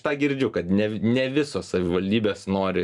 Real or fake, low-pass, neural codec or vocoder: real; 10.8 kHz; none